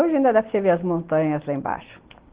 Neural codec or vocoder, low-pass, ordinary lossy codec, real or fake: none; 3.6 kHz; Opus, 16 kbps; real